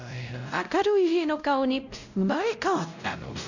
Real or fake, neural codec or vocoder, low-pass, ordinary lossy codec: fake; codec, 16 kHz, 0.5 kbps, X-Codec, WavLM features, trained on Multilingual LibriSpeech; 7.2 kHz; none